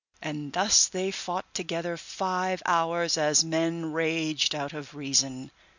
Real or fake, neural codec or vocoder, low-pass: real; none; 7.2 kHz